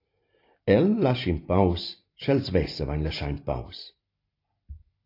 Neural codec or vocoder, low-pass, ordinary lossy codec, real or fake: none; 5.4 kHz; AAC, 32 kbps; real